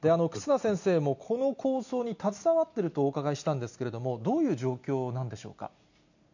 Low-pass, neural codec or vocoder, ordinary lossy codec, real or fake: 7.2 kHz; none; none; real